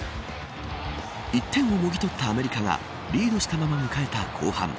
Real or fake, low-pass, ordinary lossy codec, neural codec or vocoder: real; none; none; none